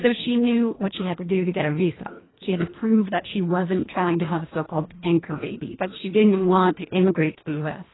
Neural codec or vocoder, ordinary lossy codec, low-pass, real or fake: codec, 24 kHz, 1.5 kbps, HILCodec; AAC, 16 kbps; 7.2 kHz; fake